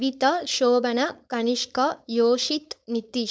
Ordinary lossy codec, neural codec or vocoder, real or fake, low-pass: none; codec, 16 kHz, 4.8 kbps, FACodec; fake; none